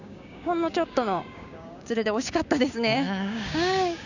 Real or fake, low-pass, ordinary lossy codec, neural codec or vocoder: fake; 7.2 kHz; none; codec, 16 kHz, 6 kbps, DAC